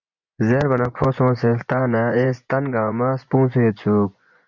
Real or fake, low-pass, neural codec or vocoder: fake; 7.2 kHz; vocoder, 24 kHz, 100 mel bands, Vocos